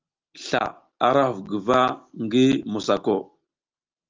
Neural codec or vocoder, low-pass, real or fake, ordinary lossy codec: none; 7.2 kHz; real; Opus, 24 kbps